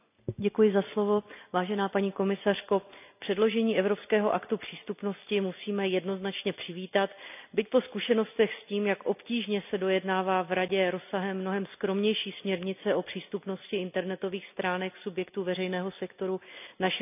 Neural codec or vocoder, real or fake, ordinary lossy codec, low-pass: none; real; none; 3.6 kHz